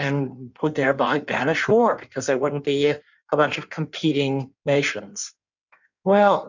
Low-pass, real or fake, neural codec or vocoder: 7.2 kHz; fake; codec, 16 kHz in and 24 kHz out, 1.1 kbps, FireRedTTS-2 codec